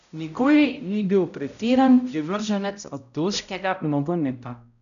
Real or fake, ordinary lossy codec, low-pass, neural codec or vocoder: fake; none; 7.2 kHz; codec, 16 kHz, 0.5 kbps, X-Codec, HuBERT features, trained on balanced general audio